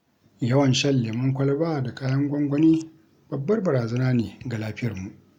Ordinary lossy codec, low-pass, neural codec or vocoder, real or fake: none; 19.8 kHz; none; real